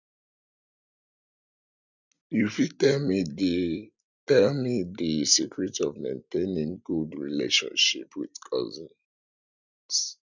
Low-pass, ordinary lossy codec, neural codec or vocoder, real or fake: 7.2 kHz; none; none; real